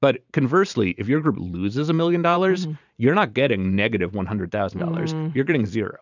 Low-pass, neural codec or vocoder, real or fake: 7.2 kHz; vocoder, 44.1 kHz, 128 mel bands every 512 samples, BigVGAN v2; fake